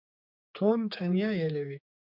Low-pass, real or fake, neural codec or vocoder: 5.4 kHz; fake; codec, 16 kHz, 4 kbps, X-Codec, HuBERT features, trained on general audio